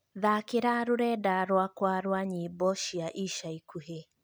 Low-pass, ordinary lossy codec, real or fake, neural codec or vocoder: none; none; real; none